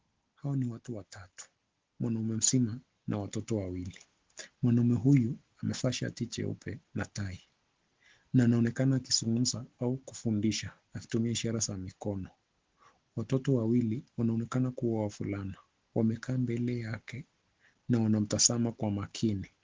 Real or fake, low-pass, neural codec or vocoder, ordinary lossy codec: real; 7.2 kHz; none; Opus, 16 kbps